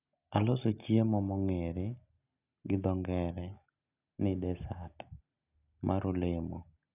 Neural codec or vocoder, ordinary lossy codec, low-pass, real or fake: none; none; 3.6 kHz; real